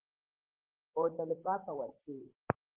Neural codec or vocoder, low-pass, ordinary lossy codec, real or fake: vocoder, 44.1 kHz, 128 mel bands, Pupu-Vocoder; 3.6 kHz; Opus, 16 kbps; fake